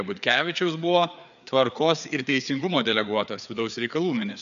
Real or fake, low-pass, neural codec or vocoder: fake; 7.2 kHz; codec, 16 kHz, 4 kbps, FreqCodec, larger model